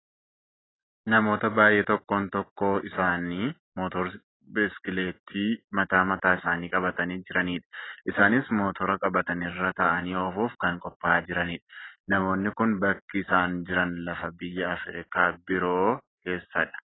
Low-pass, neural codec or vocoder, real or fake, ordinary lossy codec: 7.2 kHz; none; real; AAC, 16 kbps